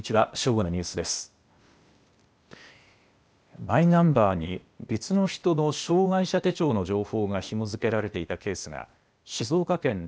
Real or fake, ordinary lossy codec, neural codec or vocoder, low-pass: fake; none; codec, 16 kHz, 0.8 kbps, ZipCodec; none